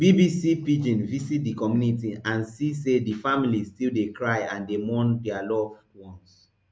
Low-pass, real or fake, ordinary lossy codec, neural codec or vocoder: none; real; none; none